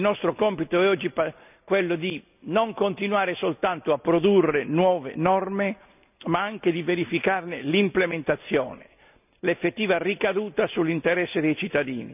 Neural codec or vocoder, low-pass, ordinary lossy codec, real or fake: none; 3.6 kHz; none; real